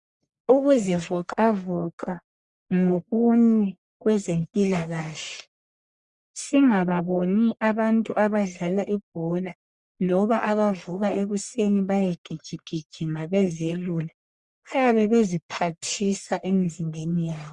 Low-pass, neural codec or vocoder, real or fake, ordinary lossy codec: 10.8 kHz; codec, 44.1 kHz, 1.7 kbps, Pupu-Codec; fake; Opus, 64 kbps